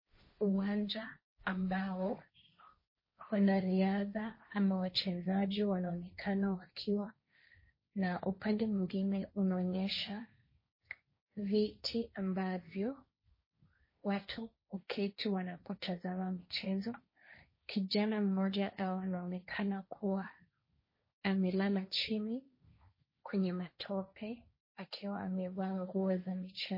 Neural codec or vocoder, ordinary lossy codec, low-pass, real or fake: codec, 16 kHz, 1.1 kbps, Voila-Tokenizer; MP3, 24 kbps; 5.4 kHz; fake